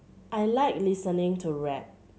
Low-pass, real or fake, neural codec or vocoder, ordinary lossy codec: none; real; none; none